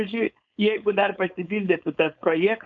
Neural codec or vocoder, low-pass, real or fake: codec, 16 kHz, 4.8 kbps, FACodec; 7.2 kHz; fake